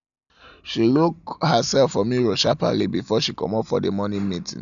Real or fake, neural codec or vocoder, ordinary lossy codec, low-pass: real; none; none; 7.2 kHz